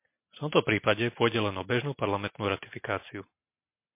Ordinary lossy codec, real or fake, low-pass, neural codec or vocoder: MP3, 24 kbps; real; 3.6 kHz; none